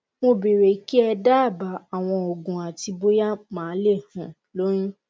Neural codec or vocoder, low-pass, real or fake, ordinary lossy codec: none; none; real; none